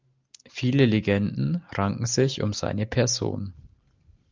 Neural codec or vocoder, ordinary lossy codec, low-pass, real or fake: none; Opus, 24 kbps; 7.2 kHz; real